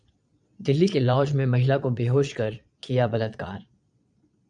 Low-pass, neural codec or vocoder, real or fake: 9.9 kHz; vocoder, 22.05 kHz, 80 mel bands, Vocos; fake